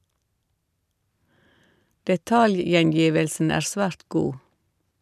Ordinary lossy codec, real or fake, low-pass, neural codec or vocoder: none; real; 14.4 kHz; none